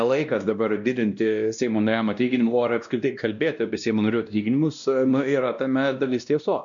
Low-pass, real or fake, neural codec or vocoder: 7.2 kHz; fake; codec, 16 kHz, 1 kbps, X-Codec, WavLM features, trained on Multilingual LibriSpeech